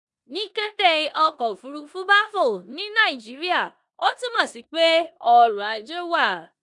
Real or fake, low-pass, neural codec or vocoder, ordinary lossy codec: fake; 10.8 kHz; codec, 16 kHz in and 24 kHz out, 0.9 kbps, LongCat-Audio-Codec, four codebook decoder; none